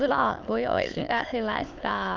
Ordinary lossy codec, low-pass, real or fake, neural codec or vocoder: Opus, 24 kbps; 7.2 kHz; fake; autoencoder, 22.05 kHz, a latent of 192 numbers a frame, VITS, trained on many speakers